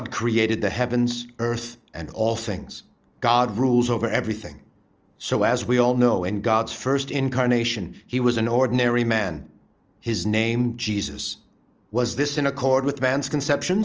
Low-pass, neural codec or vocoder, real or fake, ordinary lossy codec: 7.2 kHz; none; real; Opus, 24 kbps